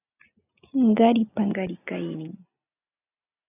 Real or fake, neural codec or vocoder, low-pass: real; none; 3.6 kHz